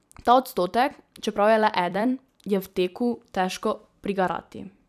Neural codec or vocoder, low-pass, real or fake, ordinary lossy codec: vocoder, 44.1 kHz, 128 mel bands every 256 samples, BigVGAN v2; 14.4 kHz; fake; none